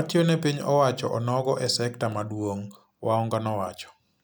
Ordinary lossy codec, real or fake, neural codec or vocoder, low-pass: none; real; none; none